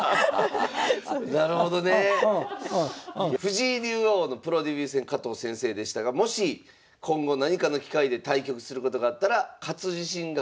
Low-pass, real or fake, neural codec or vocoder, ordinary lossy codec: none; real; none; none